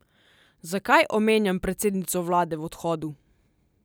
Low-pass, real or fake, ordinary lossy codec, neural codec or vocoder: none; real; none; none